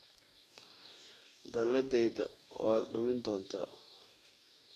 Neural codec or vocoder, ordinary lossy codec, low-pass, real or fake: codec, 44.1 kHz, 2.6 kbps, DAC; none; 14.4 kHz; fake